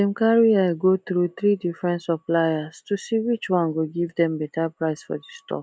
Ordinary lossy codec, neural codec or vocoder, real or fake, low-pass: none; none; real; none